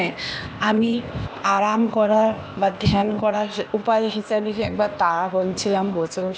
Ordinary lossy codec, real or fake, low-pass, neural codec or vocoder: none; fake; none; codec, 16 kHz, 0.8 kbps, ZipCodec